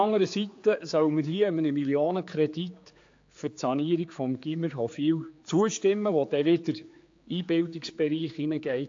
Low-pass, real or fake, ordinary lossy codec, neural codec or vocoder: 7.2 kHz; fake; AAC, 48 kbps; codec, 16 kHz, 4 kbps, X-Codec, HuBERT features, trained on general audio